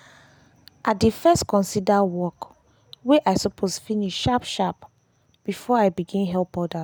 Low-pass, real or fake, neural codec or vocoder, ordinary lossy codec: none; real; none; none